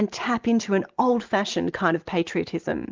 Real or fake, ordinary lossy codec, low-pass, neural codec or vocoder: real; Opus, 24 kbps; 7.2 kHz; none